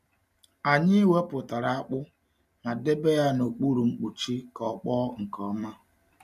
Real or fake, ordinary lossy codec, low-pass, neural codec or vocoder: real; none; 14.4 kHz; none